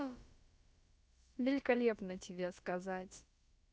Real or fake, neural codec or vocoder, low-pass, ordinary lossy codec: fake; codec, 16 kHz, about 1 kbps, DyCAST, with the encoder's durations; none; none